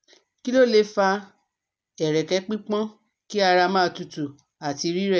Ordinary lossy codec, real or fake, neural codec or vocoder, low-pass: none; real; none; none